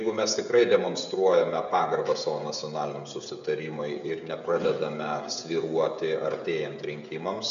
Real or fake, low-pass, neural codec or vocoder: fake; 7.2 kHz; codec, 16 kHz, 16 kbps, FreqCodec, smaller model